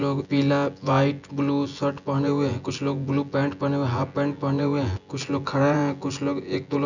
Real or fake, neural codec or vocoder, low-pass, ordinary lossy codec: fake; vocoder, 24 kHz, 100 mel bands, Vocos; 7.2 kHz; none